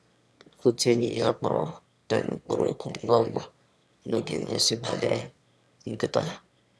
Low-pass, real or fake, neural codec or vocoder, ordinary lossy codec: none; fake; autoencoder, 22.05 kHz, a latent of 192 numbers a frame, VITS, trained on one speaker; none